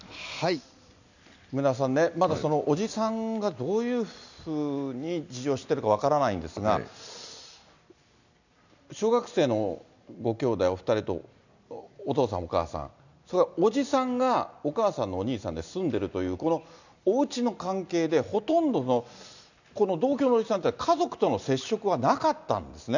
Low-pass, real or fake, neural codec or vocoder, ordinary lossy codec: 7.2 kHz; real; none; none